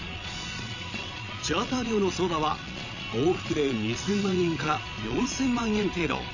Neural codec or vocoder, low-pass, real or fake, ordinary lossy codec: vocoder, 22.05 kHz, 80 mel bands, Vocos; 7.2 kHz; fake; none